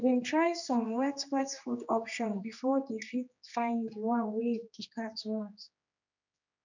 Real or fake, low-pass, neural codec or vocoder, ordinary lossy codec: fake; 7.2 kHz; codec, 16 kHz, 2 kbps, X-Codec, HuBERT features, trained on general audio; none